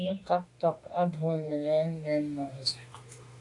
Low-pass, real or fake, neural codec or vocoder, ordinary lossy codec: 10.8 kHz; fake; autoencoder, 48 kHz, 32 numbers a frame, DAC-VAE, trained on Japanese speech; AAC, 48 kbps